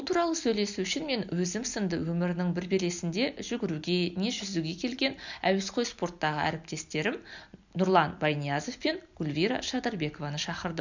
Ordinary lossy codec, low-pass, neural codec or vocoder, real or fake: none; 7.2 kHz; none; real